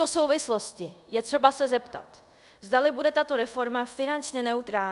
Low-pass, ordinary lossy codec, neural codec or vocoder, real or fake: 10.8 kHz; AAC, 96 kbps; codec, 24 kHz, 0.5 kbps, DualCodec; fake